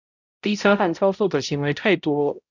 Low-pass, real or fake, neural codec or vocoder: 7.2 kHz; fake; codec, 16 kHz, 0.5 kbps, X-Codec, HuBERT features, trained on balanced general audio